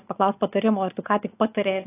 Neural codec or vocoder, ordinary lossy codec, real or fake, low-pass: none; AAC, 24 kbps; real; 3.6 kHz